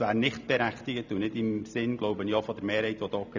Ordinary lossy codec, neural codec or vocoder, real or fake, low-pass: none; none; real; 7.2 kHz